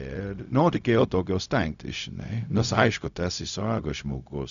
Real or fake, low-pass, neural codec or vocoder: fake; 7.2 kHz; codec, 16 kHz, 0.4 kbps, LongCat-Audio-Codec